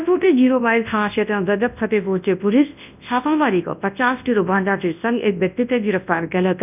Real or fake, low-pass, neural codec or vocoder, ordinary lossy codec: fake; 3.6 kHz; codec, 24 kHz, 0.9 kbps, WavTokenizer, large speech release; none